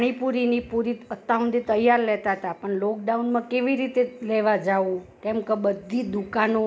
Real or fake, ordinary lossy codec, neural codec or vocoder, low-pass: real; none; none; none